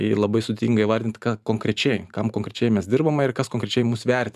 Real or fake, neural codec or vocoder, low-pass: fake; vocoder, 44.1 kHz, 128 mel bands every 512 samples, BigVGAN v2; 14.4 kHz